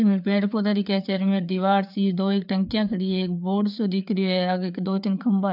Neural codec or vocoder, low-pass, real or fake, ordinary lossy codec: codec, 16 kHz, 4 kbps, FreqCodec, larger model; 5.4 kHz; fake; none